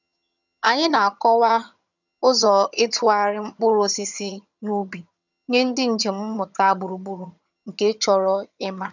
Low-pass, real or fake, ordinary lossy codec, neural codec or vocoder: 7.2 kHz; fake; none; vocoder, 22.05 kHz, 80 mel bands, HiFi-GAN